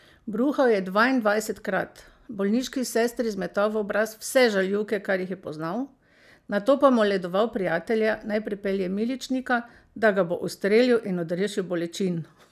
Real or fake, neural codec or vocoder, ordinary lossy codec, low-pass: real; none; none; 14.4 kHz